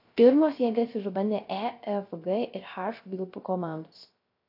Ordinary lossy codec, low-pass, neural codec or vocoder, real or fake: AAC, 32 kbps; 5.4 kHz; codec, 16 kHz, 0.3 kbps, FocalCodec; fake